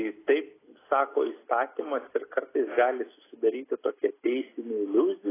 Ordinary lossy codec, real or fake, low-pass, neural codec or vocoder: AAC, 16 kbps; real; 3.6 kHz; none